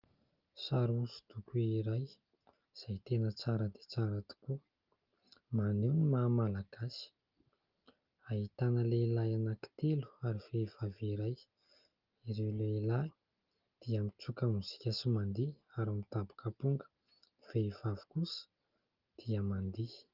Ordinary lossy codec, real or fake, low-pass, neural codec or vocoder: Opus, 24 kbps; real; 5.4 kHz; none